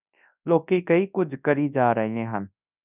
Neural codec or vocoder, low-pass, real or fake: codec, 24 kHz, 0.9 kbps, WavTokenizer, large speech release; 3.6 kHz; fake